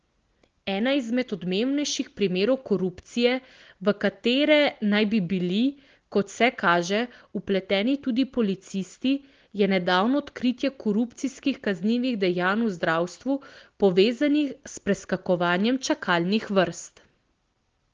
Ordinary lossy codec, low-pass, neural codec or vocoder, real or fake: Opus, 16 kbps; 7.2 kHz; none; real